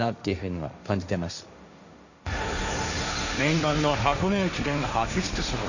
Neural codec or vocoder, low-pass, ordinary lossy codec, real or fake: codec, 16 kHz, 1.1 kbps, Voila-Tokenizer; 7.2 kHz; none; fake